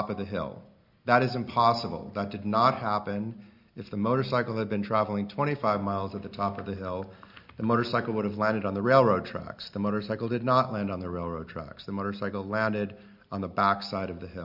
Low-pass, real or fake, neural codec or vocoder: 5.4 kHz; real; none